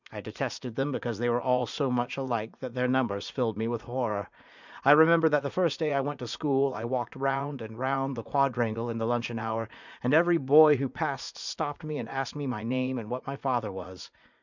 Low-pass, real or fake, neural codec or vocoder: 7.2 kHz; fake; vocoder, 44.1 kHz, 80 mel bands, Vocos